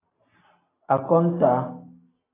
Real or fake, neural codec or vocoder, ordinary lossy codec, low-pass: real; none; AAC, 16 kbps; 3.6 kHz